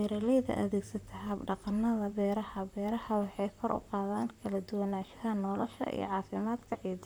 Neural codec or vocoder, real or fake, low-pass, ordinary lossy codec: vocoder, 44.1 kHz, 128 mel bands, Pupu-Vocoder; fake; none; none